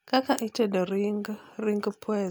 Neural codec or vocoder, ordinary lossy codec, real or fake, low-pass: none; none; real; none